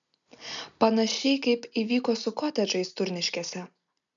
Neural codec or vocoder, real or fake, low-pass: none; real; 7.2 kHz